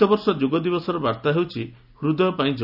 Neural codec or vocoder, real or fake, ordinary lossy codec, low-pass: none; real; none; 5.4 kHz